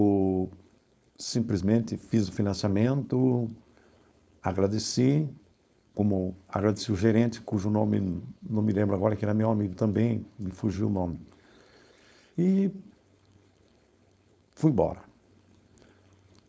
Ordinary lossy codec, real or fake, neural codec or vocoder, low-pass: none; fake; codec, 16 kHz, 4.8 kbps, FACodec; none